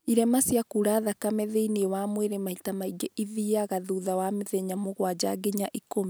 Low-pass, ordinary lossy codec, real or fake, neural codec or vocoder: none; none; real; none